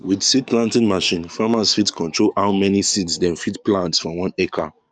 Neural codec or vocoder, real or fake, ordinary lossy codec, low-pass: codec, 44.1 kHz, 7.8 kbps, DAC; fake; none; 9.9 kHz